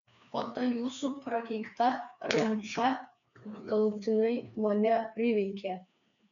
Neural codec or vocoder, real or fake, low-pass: codec, 16 kHz, 2 kbps, FreqCodec, larger model; fake; 7.2 kHz